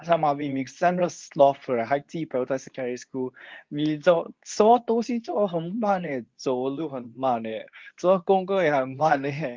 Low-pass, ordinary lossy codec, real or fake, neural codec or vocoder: 7.2 kHz; Opus, 32 kbps; fake; codec, 24 kHz, 0.9 kbps, WavTokenizer, medium speech release version 2